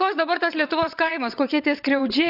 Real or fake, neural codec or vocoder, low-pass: fake; vocoder, 22.05 kHz, 80 mel bands, WaveNeXt; 5.4 kHz